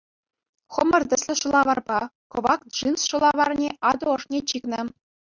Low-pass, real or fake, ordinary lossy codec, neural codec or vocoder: 7.2 kHz; real; Opus, 64 kbps; none